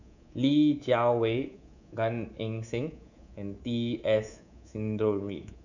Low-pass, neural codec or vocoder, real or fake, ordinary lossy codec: 7.2 kHz; codec, 24 kHz, 3.1 kbps, DualCodec; fake; none